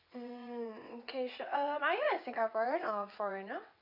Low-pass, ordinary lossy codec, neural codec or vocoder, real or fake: 5.4 kHz; none; vocoder, 22.05 kHz, 80 mel bands, WaveNeXt; fake